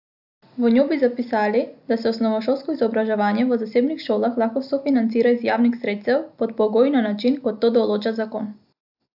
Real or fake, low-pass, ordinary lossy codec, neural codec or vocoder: real; 5.4 kHz; AAC, 48 kbps; none